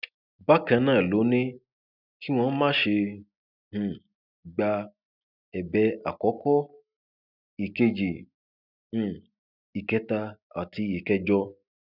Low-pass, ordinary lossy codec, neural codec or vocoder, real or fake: 5.4 kHz; none; none; real